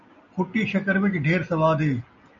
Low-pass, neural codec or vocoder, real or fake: 7.2 kHz; none; real